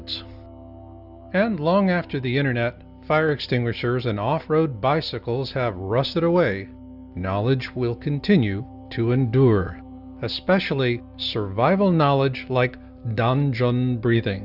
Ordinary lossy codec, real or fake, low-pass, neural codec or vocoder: Opus, 64 kbps; real; 5.4 kHz; none